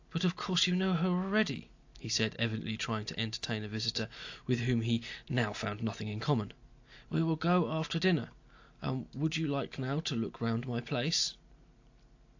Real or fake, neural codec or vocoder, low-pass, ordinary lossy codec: real; none; 7.2 kHz; AAC, 48 kbps